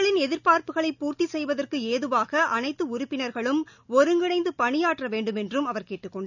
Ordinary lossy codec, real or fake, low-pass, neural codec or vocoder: none; real; 7.2 kHz; none